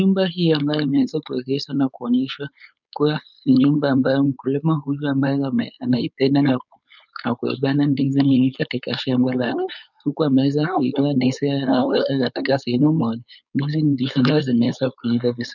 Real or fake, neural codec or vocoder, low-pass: fake; codec, 16 kHz, 4.8 kbps, FACodec; 7.2 kHz